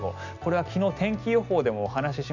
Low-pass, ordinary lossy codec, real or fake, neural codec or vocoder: 7.2 kHz; none; real; none